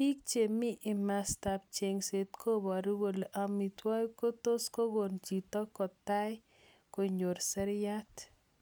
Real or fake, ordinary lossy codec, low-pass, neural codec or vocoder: real; none; none; none